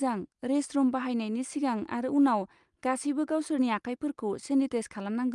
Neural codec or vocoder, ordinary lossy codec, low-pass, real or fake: none; Opus, 32 kbps; 10.8 kHz; real